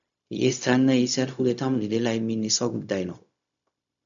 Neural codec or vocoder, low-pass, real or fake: codec, 16 kHz, 0.4 kbps, LongCat-Audio-Codec; 7.2 kHz; fake